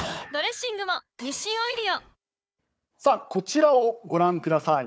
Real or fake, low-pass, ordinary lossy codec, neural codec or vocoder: fake; none; none; codec, 16 kHz, 4 kbps, FunCodec, trained on Chinese and English, 50 frames a second